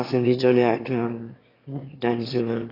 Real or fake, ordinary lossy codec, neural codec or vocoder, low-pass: fake; AAC, 24 kbps; autoencoder, 22.05 kHz, a latent of 192 numbers a frame, VITS, trained on one speaker; 5.4 kHz